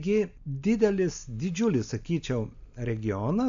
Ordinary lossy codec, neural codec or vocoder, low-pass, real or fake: AAC, 64 kbps; none; 7.2 kHz; real